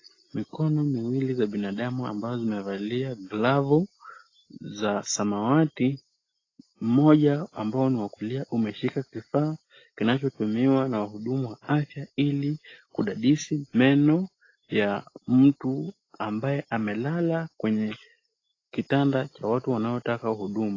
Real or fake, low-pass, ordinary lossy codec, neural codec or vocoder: real; 7.2 kHz; AAC, 32 kbps; none